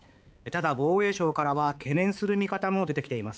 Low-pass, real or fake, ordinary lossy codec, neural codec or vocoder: none; fake; none; codec, 16 kHz, 4 kbps, X-Codec, HuBERT features, trained on balanced general audio